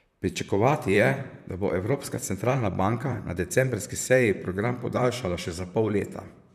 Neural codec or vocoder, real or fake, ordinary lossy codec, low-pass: vocoder, 44.1 kHz, 128 mel bands, Pupu-Vocoder; fake; none; 14.4 kHz